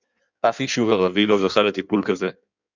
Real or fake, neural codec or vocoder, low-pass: fake; codec, 16 kHz in and 24 kHz out, 1.1 kbps, FireRedTTS-2 codec; 7.2 kHz